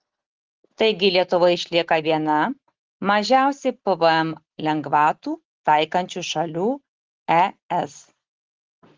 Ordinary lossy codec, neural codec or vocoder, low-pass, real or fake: Opus, 16 kbps; none; 7.2 kHz; real